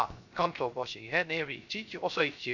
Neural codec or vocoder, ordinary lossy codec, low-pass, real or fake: codec, 16 kHz, 0.3 kbps, FocalCodec; none; 7.2 kHz; fake